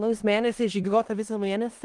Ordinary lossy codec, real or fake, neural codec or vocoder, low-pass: Opus, 24 kbps; fake; codec, 16 kHz in and 24 kHz out, 0.4 kbps, LongCat-Audio-Codec, four codebook decoder; 10.8 kHz